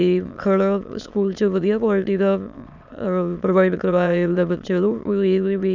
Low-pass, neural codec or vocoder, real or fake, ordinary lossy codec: 7.2 kHz; autoencoder, 22.05 kHz, a latent of 192 numbers a frame, VITS, trained on many speakers; fake; none